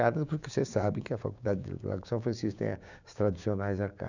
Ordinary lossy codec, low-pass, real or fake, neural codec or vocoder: none; 7.2 kHz; fake; codec, 16 kHz, 6 kbps, DAC